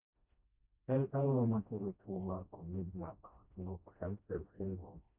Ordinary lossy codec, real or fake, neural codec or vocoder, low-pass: none; fake; codec, 16 kHz, 1 kbps, FreqCodec, smaller model; 3.6 kHz